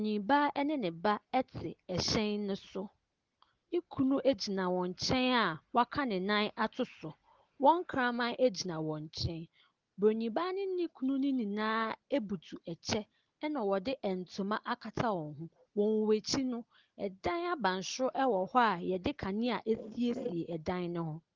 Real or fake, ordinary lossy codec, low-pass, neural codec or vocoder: real; Opus, 16 kbps; 7.2 kHz; none